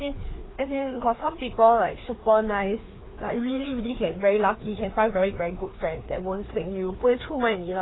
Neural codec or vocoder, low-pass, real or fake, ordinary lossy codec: codec, 16 kHz, 2 kbps, FreqCodec, larger model; 7.2 kHz; fake; AAC, 16 kbps